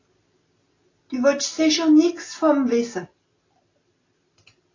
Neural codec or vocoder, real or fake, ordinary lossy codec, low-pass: none; real; AAC, 32 kbps; 7.2 kHz